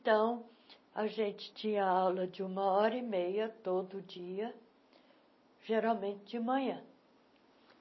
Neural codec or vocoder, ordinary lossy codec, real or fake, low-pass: none; MP3, 24 kbps; real; 7.2 kHz